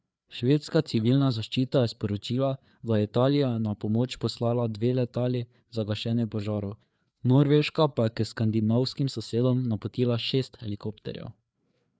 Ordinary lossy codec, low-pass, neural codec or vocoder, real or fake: none; none; codec, 16 kHz, 4 kbps, FreqCodec, larger model; fake